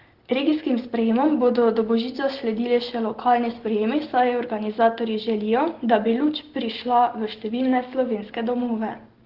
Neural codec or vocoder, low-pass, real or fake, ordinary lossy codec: none; 5.4 kHz; real; Opus, 16 kbps